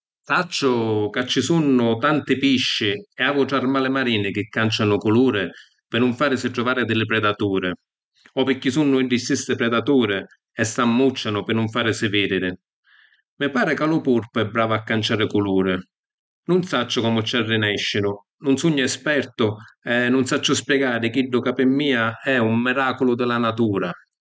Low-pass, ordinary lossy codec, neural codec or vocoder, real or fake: none; none; none; real